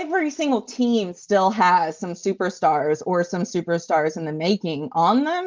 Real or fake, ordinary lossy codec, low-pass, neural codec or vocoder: real; Opus, 32 kbps; 7.2 kHz; none